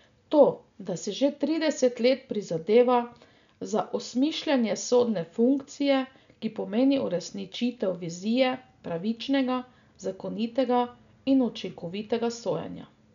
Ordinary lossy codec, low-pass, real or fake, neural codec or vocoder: none; 7.2 kHz; real; none